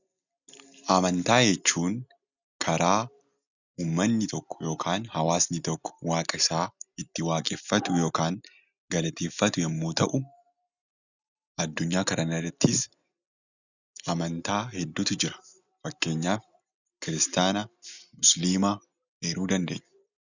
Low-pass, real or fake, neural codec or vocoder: 7.2 kHz; real; none